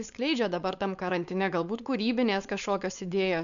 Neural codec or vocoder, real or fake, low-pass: codec, 16 kHz, 4.8 kbps, FACodec; fake; 7.2 kHz